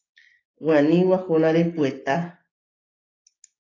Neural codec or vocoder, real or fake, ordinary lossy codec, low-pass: codec, 16 kHz, 6 kbps, DAC; fake; AAC, 32 kbps; 7.2 kHz